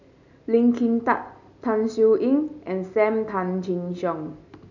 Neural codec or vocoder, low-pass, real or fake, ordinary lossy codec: none; 7.2 kHz; real; none